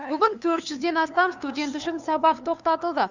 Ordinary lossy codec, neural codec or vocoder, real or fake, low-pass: none; codec, 16 kHz, 2 kbps, FunCodec, trained on LibriTTS, 25 frames a second; fake; 7.2 kHz